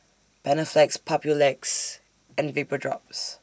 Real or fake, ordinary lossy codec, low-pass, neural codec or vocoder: real; none; none; none